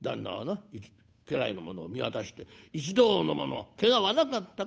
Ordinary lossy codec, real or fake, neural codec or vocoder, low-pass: none; fake; codec, 16 kHz, 8 kbps, FunCodec, trained on Chinese and English, 25 frames a second; none